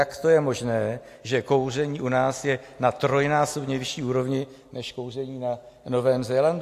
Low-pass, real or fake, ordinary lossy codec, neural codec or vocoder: 14.4 kHz; real; AAC, 64 kbps; none